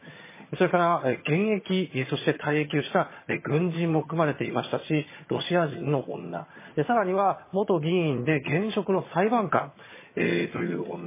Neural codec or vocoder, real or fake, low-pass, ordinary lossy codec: vocoder, 22.05 kHz, 80 mel bands, HiFi-GAN; fake; 3.6 kHz; MP3, 16 kbps